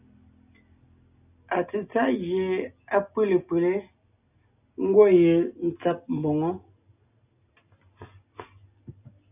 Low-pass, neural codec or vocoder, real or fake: 3.6 kHz; none; real